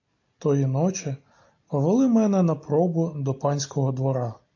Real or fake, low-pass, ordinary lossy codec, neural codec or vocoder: real; 7.2 kHz; AAC, 48 kbps; none